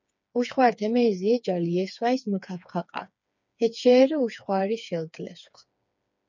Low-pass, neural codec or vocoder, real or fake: 7.2 kHz; codec, 16 kHz, 4 kbps, FreqCodec, smaller model; fake